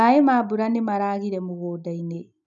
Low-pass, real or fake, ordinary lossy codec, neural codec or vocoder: 7.2 kHz; real; none; none